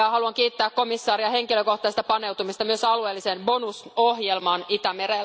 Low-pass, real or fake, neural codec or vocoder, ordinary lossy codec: none; real; none; none